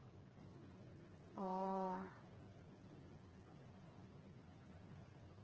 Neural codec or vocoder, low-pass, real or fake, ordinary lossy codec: codec, 16 kHz, 4 kbps, FreqCodec, smaller model; 7.2 kHz; fake; Opus, 16 kbps